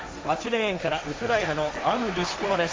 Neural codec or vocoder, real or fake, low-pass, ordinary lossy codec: codec, 16 kHz, 1.1 kbps, Voila-Tokenizer; fake; none; none